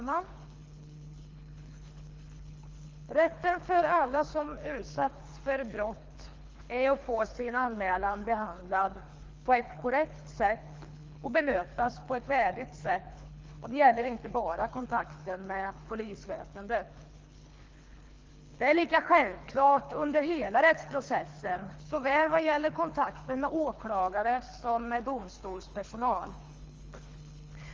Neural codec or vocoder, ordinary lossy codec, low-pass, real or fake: codec, 24 kHz, 3 kbps, HILCodec; Opus, 32 kbps; 7.2 kHz; fake